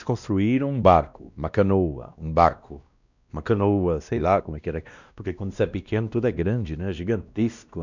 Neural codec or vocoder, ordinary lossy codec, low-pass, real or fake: codec, 16 kHz, 1 kbps, X-Codec, WavLM features, trained on Multilingual LibriSpeech; none; 7.2 kHz; fake